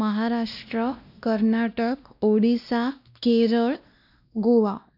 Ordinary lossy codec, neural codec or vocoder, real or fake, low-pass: none; codec, 16 kHz, 1 kbps, X-Codec, WavLM features, trained on Multilingual LibriSpeech; fake; 5.4 kHz